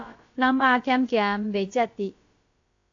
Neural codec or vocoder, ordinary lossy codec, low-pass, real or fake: codec, 16 kHz, about 1 kbps, DyCAST, with the encoder's durations; AAC, 48 kbps; 7.2 kHz; fake